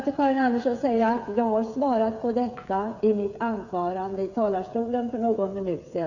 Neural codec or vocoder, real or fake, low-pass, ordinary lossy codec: codec, 16 kHz, 8 kbps, FreqCodec, smaller model; fake; 7.2 kHz; none